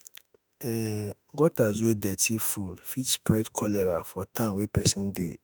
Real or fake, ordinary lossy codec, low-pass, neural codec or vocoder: fake; none; none; autoencoder, 48 kHz, 32 numbers a frame, DAC-VAE, trained on Japanese speech